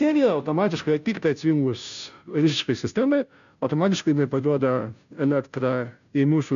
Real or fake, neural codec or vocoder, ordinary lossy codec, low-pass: fake; codec, 16 kHz, 0.5 kbps, FunCodec, trained on Chinese and English, 25 frames a second; MP3, 96 kbps; 7.2 kHz